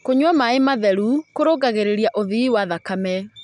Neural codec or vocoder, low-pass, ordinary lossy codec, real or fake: none; 10.8 kHz; none; real